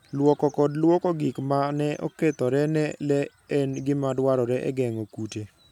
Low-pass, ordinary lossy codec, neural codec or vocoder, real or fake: 19.8 kHz; none; none; real